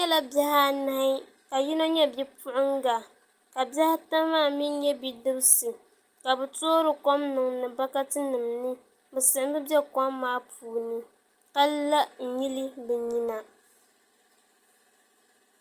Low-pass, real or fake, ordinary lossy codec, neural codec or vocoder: 14.4 kHz; real; Opus, 32 kbps; none